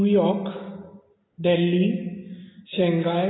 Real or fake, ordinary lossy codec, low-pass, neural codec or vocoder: real; AAC, 16 kbps; 7.2 kHz; none